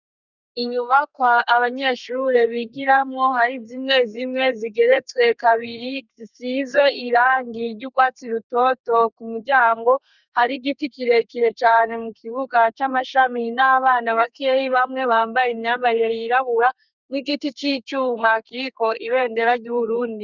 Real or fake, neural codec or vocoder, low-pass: fake; codec, 32 kHz, 1.9 kbps, SNAC; 7.2 kHz